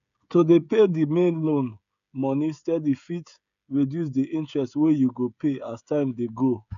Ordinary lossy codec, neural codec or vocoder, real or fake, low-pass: none; codec, 16 kHz, 16 kbps, FreqCodec, smaller model; fake; 7.2 kHz